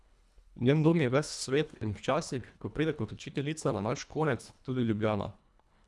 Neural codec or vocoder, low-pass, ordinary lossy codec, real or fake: codec, 24 kHz, 1.5 kbps, HILCodec; none; none; fake